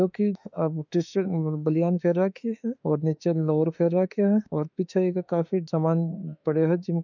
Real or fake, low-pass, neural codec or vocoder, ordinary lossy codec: fake; 7.2 kHz; autoencoder, 48 kHz, 32 numbers a frame, DAC-VAE, trained on Japanese speech; none